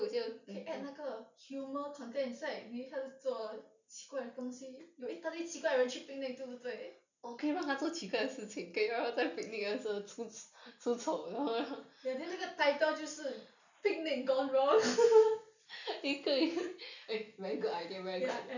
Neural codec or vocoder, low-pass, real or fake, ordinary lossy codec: none; 7.2 kHz; real; none